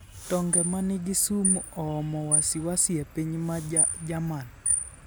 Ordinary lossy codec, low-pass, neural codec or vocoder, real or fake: none; none; none; real